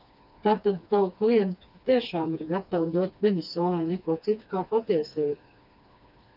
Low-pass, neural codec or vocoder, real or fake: 5.4 kHz; codec, 16 kHz, 2 kbps, FreqCodec, smaller model; fake